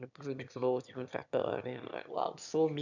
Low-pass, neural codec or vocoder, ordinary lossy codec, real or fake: 7.2 kHz; autoencoder, 22.05 kHz, a latent of 192 numbers a frame, VITS, trained on one speaker; none; fake